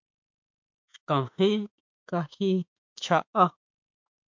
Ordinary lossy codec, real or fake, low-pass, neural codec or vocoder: MP3, 64 kbps; fake; 7.2 kHz; autoencoder, 48 kHz, 32 numbers a frame, DAC-VAE, trained on Japanese speech